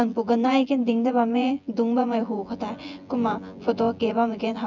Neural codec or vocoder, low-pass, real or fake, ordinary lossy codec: vocoder, 24 kHz, 100 mel bands, Vocos; 7.2 kHz; fake; none